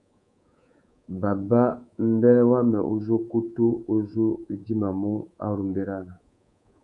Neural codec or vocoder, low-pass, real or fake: codec, 24 kHz, 3.1 kbps, DualCodec; 10.8 kHz; fake